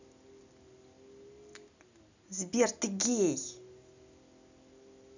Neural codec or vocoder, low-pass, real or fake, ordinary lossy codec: none; 7.2 kHz; real; none